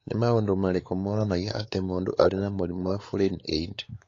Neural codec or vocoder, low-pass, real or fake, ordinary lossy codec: codec, 16 kHz, 4 kbps, X-Codec, WavLM features, trained on Multilingual LibriSpeech; 7.2 kHz; fake; AAC, 32 kbps